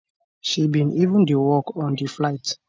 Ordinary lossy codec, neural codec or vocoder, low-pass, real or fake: none; none; none; real